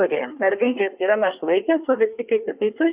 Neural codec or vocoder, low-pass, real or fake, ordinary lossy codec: codec, 24 kHz, 1 kbps, SNAC; 3.6 kHz; fake; Opus, 64 kbps